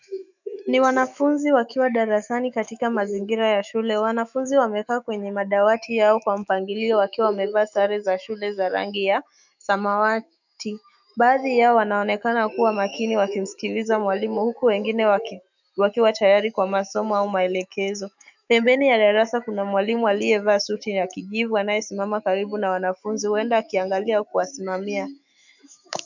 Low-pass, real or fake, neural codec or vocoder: 7.2 kHz; fake; autoencoder, 48 kHz, 128 numbers a frame, DAC-VAE, trained on Japanese speech